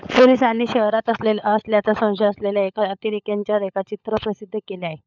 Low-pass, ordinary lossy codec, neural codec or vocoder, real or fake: 7.2 kHz; none; codec, 16 kHz, 16 kbps, FunCodec, trained on LibriTTS, 50 frames a second; fake